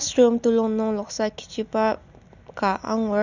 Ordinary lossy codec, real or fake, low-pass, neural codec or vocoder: none; real; 7.2 kHz; none